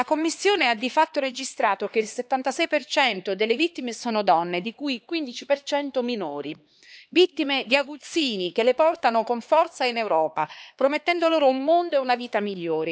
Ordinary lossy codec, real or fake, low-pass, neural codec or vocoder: none; fake; none; codec, 16 kHz, 2 kbps, X-Codec, HuBERT features, trained on LibriSpeech